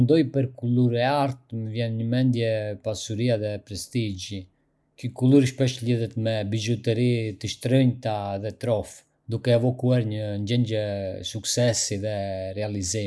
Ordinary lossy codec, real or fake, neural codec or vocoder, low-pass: none; real; none; none